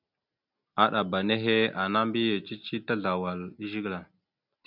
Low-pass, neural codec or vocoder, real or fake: 5.4 kHz; none; real